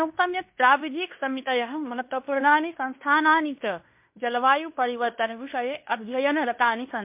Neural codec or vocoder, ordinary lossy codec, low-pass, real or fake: codec, 16 kHz in and 24 kHz out, 0.9 kbps, LongCat-Audio-Codec, fine tuned four codebook decoder; MP3, 32 kbps; 3.6 kHz; fake